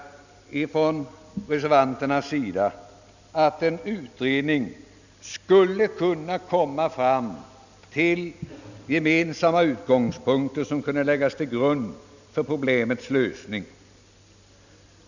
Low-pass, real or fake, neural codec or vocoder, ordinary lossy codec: 7.2 kHz; real; none; none